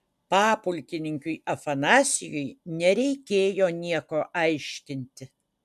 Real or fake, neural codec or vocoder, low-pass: real; none; 14.4 kHz